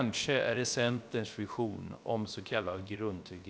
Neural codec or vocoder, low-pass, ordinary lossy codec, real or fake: codec, 16 kHz, about 1 kbps, DyCAST, with the encoder's durations; none; none; fake